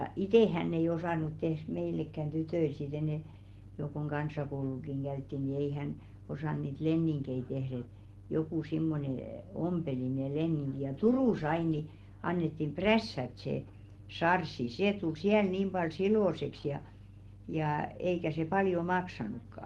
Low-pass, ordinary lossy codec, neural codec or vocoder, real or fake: 19.8 kHz; Opus, 16 kbps; none; real